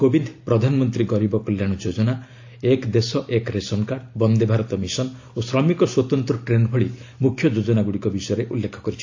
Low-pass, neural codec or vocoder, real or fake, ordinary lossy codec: 7.2 kHz; none; real; AAC, 48 kbps